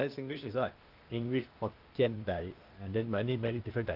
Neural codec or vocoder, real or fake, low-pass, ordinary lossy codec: codec, 16 kHz, 0.8 kbps, ZipCodec; fake; 5.4 kHz; Opus, 24 kbps